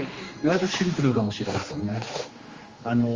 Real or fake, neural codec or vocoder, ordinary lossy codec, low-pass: fake; codec, 16 kHz, 2 kbps, X-Codec, HuBERT features, trained on general audio; Opus, 32 kbps; 7.2 kHz